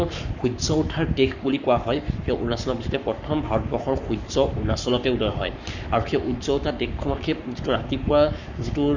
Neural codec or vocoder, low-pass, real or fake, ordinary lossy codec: codec, 44.1 kHz, 7.8 kbps, Pupu-Codec; 7.2 kHz; fake; none